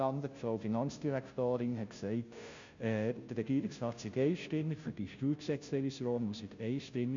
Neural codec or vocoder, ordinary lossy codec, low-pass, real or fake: codec, 16 kHz, 0.5 kbps, FunCodec, trained on Chinese and English, 25 frames a second; MP3, 64 kbps; 7.2 kHz; fake